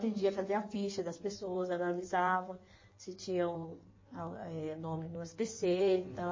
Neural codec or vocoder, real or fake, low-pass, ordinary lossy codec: codec, 16 kHz in and 24 kHz out, 1.1 kbps, FireRedTTS-2 codec; fake; 7.2 kHz; MP3, 32 kbps